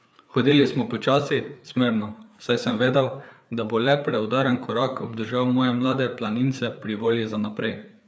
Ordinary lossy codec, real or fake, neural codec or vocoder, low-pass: none; fake; codec, 16 kHz, 4 kbps, FreqCodec, larger model; none